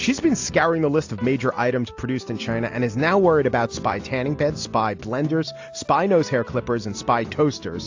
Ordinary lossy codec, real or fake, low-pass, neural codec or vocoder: AAC, 48 kbps; real; 7.2 kHz; none